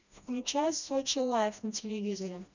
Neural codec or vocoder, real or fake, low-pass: codec, 16 kHz, 1 kbps, FreqCodec, smaller model; fake; 7.2 kHz